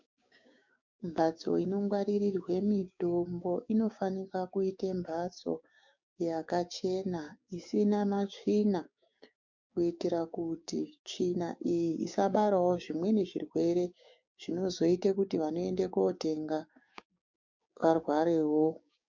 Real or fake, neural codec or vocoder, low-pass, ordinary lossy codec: fake; codec, 16 kHz, 6 kbps, DAC; 7.2 kHz; MP3, 64 kbps